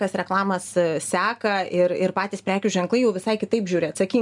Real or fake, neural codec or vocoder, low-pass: real; none; 10.8 kHz